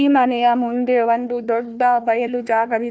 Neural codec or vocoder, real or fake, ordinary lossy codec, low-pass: codec, 16 kHz, 1 kbps, FunCodec, trained on Chinese and English, 50 frames a second; fake; none; none